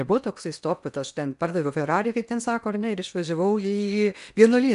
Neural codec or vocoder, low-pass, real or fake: codec, 16 kHz in and 24 kHz out, 0.8 kbps, FocalCodec, streaming, 65536 codes; 10.8 kHz; fake